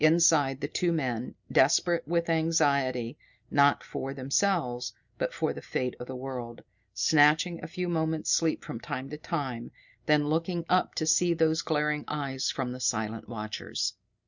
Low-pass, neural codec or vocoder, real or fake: 7.2 kHz; none; real